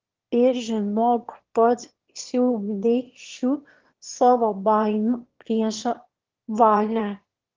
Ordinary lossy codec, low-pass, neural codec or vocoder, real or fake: Opus, 16 kbps; 7.2 kHz; autoencoder, 22.05 kHz, a latent of 192 numbers a frame, VITS, trained on one speaker; fake